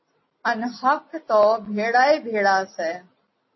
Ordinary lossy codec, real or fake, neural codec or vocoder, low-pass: MP3, 24 kbps; real; none; 7.2 kHz